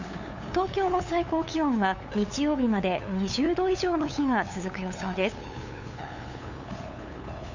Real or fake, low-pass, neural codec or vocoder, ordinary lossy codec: fake; 7.2 kHz; codec, 16 kHz, 8 kbps, FunCodec, trained on LibriTTS, 25 frames a second; Opus, 64 kbps